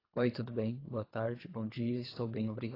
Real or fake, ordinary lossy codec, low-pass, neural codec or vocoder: fake; AAC, 24 kbps; 5.4 kHz; codec, 24 kHz, 3 kbps, HILCodec